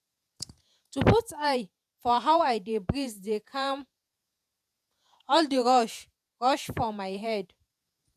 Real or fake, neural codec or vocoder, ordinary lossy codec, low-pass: fake; vocoder, 48 kHz, 128 mel bands, Vocos; none; 14.4 kHz